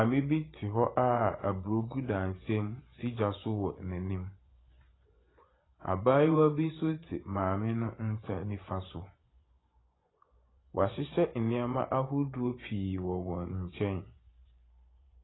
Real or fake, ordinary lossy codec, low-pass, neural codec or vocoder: fake; AAC, 16 kbps; 7.2 kHz; vocoder, 24 kHz, 100 mel bands, Vocos